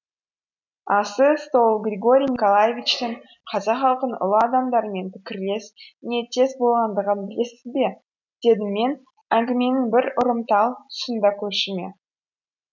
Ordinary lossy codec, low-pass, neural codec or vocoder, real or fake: none; 7.2 kHz; none; real